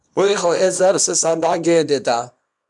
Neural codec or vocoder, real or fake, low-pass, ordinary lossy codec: codec, 24 kHz, 0.9 kbps, WavTokenizer, small release; fake; 10.8 kHz; MP3, 96 kbps